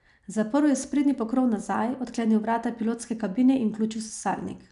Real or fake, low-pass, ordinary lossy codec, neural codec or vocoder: real; 10.8 kHz; none; none